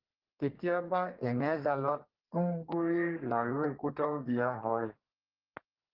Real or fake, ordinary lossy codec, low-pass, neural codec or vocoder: fake; Opus, 16 kbps; 5.4 kHz; codec, 32 kHz, 1.9 kbps, SNAC